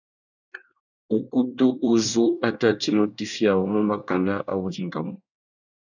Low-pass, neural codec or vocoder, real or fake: 7.2 kHz; codec, 24 kHz, 1 kbps, SNAC; fake